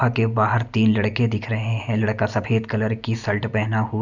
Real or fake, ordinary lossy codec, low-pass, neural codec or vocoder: real; none; 7.2 kHz; none